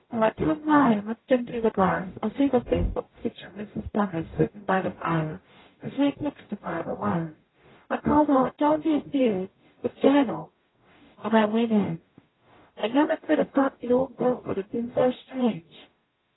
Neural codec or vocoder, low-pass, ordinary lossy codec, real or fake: codec, 44.1 kHz, 0.9 kbps, DAC; 7.2 kHz; AAC, 16 kbps; fake